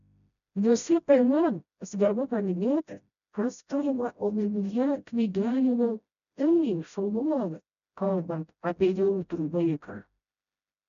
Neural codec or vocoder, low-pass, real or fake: codec, 16 kHz, 0.5 kbps, FreqCodec, smaller model; 7.2 kHz; fake